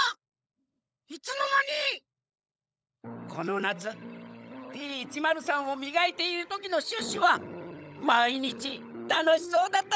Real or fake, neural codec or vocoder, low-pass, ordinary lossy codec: fake; codec, 16 kHz, 16 kbps, FunCodec, trained on LibriTTS, 50 frames a second; none; none